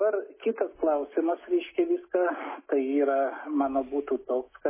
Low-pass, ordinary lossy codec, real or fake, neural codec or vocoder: 3.6 kHz; MP3, 16 kbps; real; none